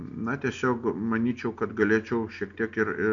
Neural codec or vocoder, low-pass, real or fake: none; 7.2 kHz; real